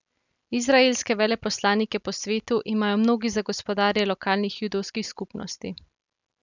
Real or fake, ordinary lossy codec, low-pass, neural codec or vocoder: real; none; 7.2 kHz; none